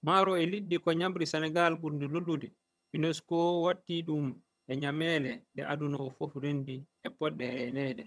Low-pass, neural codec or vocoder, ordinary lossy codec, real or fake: none; vocoder, 22.05 kHz, 80 mel bands, HiFi-GAN; none; fake